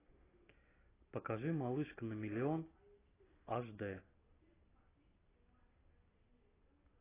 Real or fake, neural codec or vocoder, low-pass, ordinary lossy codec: real; none; 3.6 kHz; AAC, 16 kbps